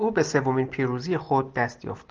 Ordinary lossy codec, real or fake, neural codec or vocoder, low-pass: Opus, 32 kbps; real; none; 7.2 kHz